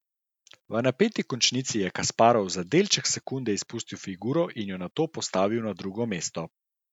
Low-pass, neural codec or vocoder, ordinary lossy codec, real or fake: 19.8 kHz; none; none; real